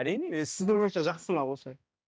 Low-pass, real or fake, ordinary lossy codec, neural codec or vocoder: none; fake; none; codec, 16 kHz, 1 kbps, X-Codec, HuBERT features, trained on balanced general audio